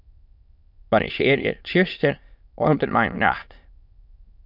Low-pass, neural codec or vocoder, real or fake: 5.4 kHz; autoencoder, 22.05 kHz, a latent of 192 numbers a frame, VITS, trained on many speakers; fake